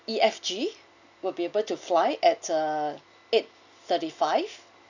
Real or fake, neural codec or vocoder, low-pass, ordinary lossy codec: real; none; 7.2 kHz; none